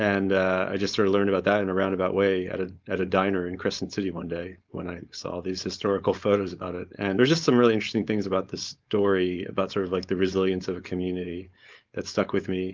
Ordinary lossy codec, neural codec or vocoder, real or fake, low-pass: Opus, 24 kbps; codec, 16 kHz, 4.8 kbps, FACodec; fake; 7.2 kHz